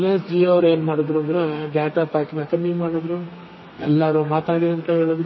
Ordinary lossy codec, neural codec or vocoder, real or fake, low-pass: MP3, 24 kbps; codec, 32 kHz, 1.9 kbps, SNAC; fake; 7.2 kHz